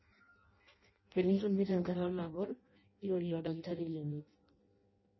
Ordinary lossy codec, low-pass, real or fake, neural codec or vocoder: MP3, 24 kbps; 7.2 kHz; fake; codec, 16 kHz in and 24 kHz out, 0.6 kbps, FireRedTTS-2 codec